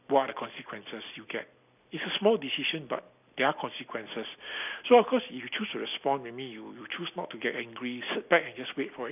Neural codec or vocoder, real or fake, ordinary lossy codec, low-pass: none; real; none; 3.6 kHz